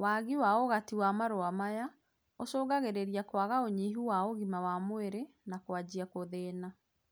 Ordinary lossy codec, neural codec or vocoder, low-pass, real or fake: none; none; none; real